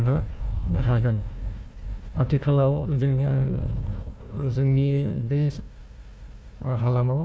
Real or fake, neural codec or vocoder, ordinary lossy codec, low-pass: fake; codec, 16 kHz, 1 kbps, FunCodec, trained on Chinese and English, 50 frames a second; none; none